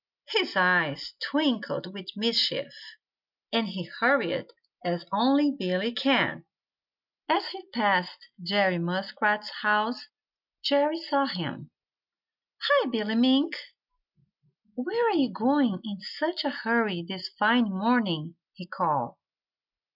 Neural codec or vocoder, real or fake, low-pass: none; real; 5.4 kHz